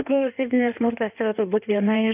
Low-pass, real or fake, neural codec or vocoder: 3.6 kHz; fake; codec, 16 kHz in and 24 kHz out, 1.1 kbps, FireRedTTS-2 codec